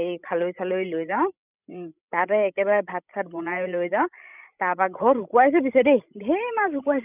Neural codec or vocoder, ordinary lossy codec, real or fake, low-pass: codec, 16 kHz, 16 kbps, FreqCodec, larger model; none; fake; 3.6 kHz